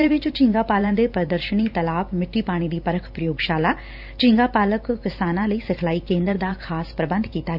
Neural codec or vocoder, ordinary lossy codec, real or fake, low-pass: vocoder, 44.1 kHz, 128 mel bands every 512 samples, BigVGAN v2; none; fake; 5.4 kHz